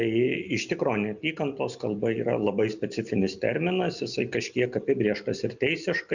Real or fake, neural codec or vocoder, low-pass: real; none; 7.2 kHz